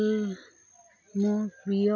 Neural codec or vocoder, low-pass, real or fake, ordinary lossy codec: none; 7.2 kHz; real; none